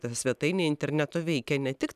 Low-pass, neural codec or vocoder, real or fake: 14.4 kHz; none; real